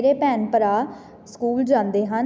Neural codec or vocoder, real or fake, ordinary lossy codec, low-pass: none; real; none; none